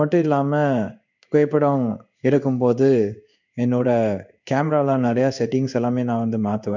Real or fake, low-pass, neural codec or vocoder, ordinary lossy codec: fake; 7.2 kHz; codec, 16 kHz in and 24 kHz out, 1 kbps, XY-Tokenizer; none